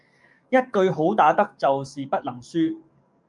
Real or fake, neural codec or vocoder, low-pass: fake; codec, 44.1 kHz, 7.8 kbps, DAC; 10.8 kHz